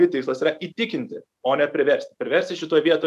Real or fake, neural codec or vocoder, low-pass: fake; vocoder, 44.1 kHz, 128 mel bands every 256 samples, BigVGAN v2; 14.4 kHz